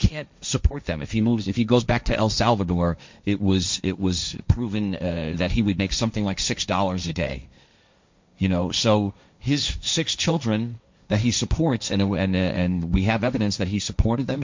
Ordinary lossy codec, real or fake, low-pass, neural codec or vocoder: MP3, 64 kbps; fake; 7.2 kHz; codec, 16 kHz, 1.1 kbps, Voila-Tokenizer